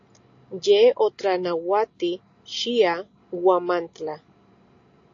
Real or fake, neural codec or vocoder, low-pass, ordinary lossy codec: real; none; 7.2 kHz; AAC, 48 kbps